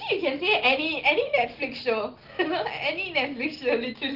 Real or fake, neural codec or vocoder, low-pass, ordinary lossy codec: real; none; 5.4 kHz; Opus, 16 kbps